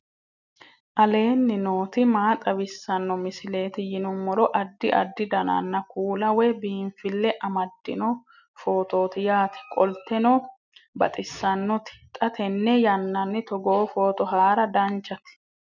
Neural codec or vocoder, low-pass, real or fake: none; 7.2 kHz; real